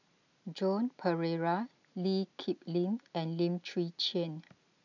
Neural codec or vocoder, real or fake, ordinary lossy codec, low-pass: none; real; none; 7.2 kHz